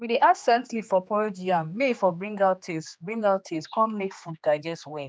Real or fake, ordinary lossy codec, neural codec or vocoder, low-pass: fake; none; codec, 16 kHz, 2 kbps, X-Codec, HuBERT features, trained on general audio; none